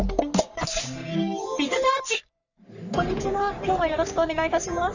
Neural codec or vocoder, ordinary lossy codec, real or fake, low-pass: codec, 44.1 kHz, 3.4 kbps, Pupu-Codec; none; fake; 7.2 kHz